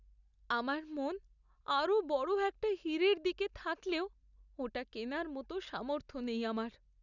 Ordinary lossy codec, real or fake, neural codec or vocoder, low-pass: none; real; none; 7.2 kHz